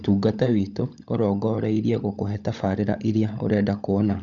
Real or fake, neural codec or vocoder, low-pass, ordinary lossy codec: fake; codec, 16 kHz, 16 kbps, FunCodec, trained on LibriTTS, 50 frames a second; 7.2 kHz; none